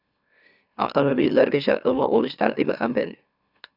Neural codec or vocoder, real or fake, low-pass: autoencoder, 44.1 kHz, a latent of 192 numbers a frame, MeloTTS; fake; 5.4 kHz